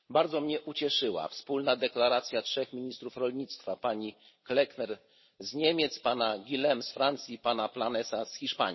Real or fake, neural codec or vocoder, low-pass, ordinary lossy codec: real; none; 7.2 kHz; MP3, 24 kbps